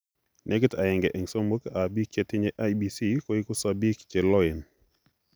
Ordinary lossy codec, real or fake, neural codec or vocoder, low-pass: none; real; none; none